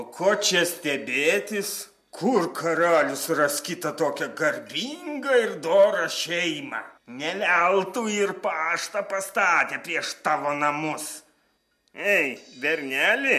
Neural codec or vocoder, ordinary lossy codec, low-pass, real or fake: none; MP3, 64 kbps; 14.4 kHz; real